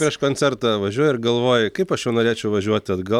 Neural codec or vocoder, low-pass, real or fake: none; 19.8 kHz; real